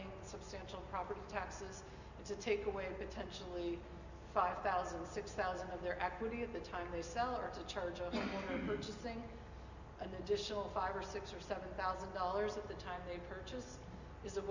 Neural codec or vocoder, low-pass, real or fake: none; 7.2 kHz; real